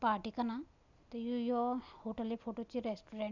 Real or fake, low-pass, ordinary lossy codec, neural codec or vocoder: real; 7.2 kHz; none; none